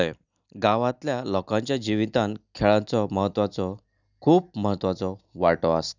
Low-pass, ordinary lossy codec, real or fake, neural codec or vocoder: 7.2 kHz; none; real; none